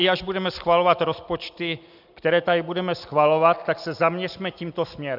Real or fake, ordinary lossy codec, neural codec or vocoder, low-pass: real; AAC, 48 kbps; none; 5.4 kHz